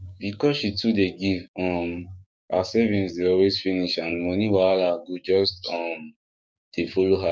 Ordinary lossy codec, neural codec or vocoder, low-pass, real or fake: none; codec, 16 kHz, 6 kbps, DAC; none; fake